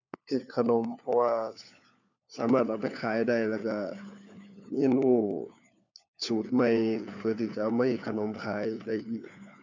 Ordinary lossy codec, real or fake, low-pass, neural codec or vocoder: none; fake; 7.2 kHz; codec, 16 kHz, 4 kbps, FunCodec, trained on LibriTTS, 50 frames a second